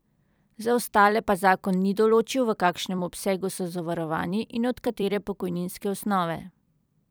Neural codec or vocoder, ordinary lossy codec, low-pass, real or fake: vocoder, 44.1 kHz, 128 mel bands every 256 samples, BigVGAN v2; none; none; fake